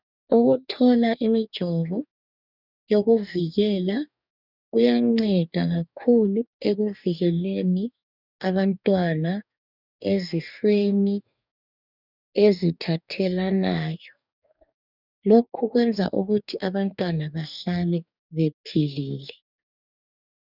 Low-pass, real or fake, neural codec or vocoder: 5.4 kHz; fake; codec, 44.1 kHz, 2.6 kbps, DAC